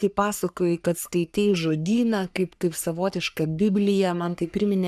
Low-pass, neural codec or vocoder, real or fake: 14.4 kHz; codec, 44.1 kHz, 3.4 kbps, Pupu-Codec; fake